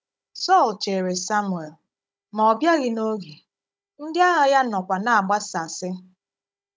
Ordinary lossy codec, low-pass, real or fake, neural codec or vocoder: none; none; fake; codec, 16 kHz, 16 kbps, FunCodec, trained on Chinese and English, 50 frames a second